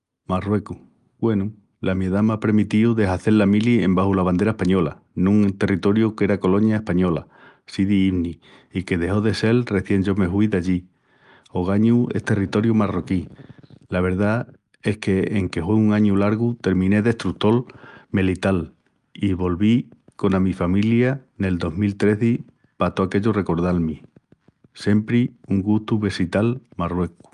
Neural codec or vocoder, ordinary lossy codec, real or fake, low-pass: none; Opus, 32 kbps; real; 10.8 kHz